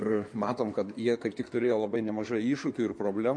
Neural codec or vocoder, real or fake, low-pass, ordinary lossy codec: codec, 16 kHz in and 24 kHz out, 2.2 kbps, FireRedTTS-2 codec; fake; 9.9 kHz; MP3, 48 kbps